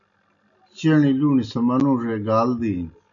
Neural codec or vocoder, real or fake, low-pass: none; real; 7.2 kHz